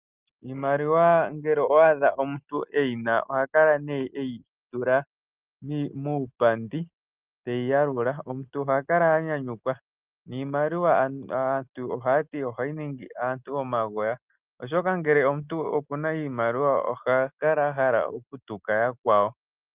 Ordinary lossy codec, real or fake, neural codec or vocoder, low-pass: Opus, 24 kbps; real; none; 3.6 kHz